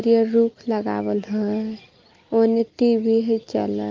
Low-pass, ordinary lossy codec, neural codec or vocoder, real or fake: 7.2 kHz; Opus, 32 kbps; none; real